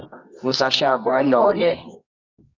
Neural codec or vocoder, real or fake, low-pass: codec, 24 kHz, 0.9 kbps, WavTokenizer, medium music audio release; fake; 7.2 kHz